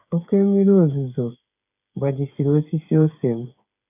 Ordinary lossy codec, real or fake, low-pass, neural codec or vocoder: none; fake; 3.6 kHz; codec, 16 kHz, 8 kbps, FreqCodec, smaller model